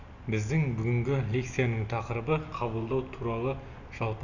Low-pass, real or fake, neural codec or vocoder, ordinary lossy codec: 7.2 kHz; real; none; none